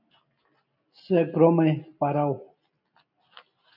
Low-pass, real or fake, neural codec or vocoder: 5.4 kHz; real; none